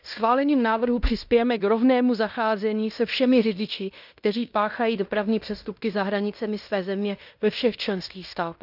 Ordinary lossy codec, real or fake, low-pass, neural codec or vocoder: none; fake; 5.4 kHz; codec, 16 kHz in and 24 kHz out, 0.9 kbps, LongCat-Audio-Codec, fine tuned four codebook decoder